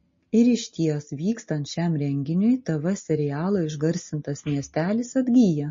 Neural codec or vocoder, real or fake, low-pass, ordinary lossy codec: none; real; 7.2 kHz; MP3, 32 kbps